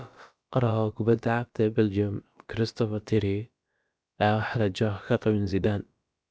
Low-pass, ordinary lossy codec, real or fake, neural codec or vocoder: none; none; fake; codec, 16 kHz, about 1 kbps, DyCAST, with the encoder's durations